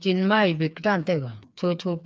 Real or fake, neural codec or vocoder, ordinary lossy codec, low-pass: fake; codec, 16 kHz, 4 kbps, FreqCodec, smaller model; none; none